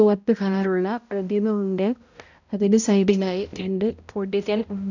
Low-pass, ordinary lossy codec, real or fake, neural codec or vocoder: 7.2 kHz; none; fake; codec, 16 kHz, 0.5 kbps, X-Codec, HuBERT features, trained on balanced general audio